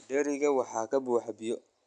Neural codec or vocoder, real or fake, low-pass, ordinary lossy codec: none; real; 9.9 kHz; none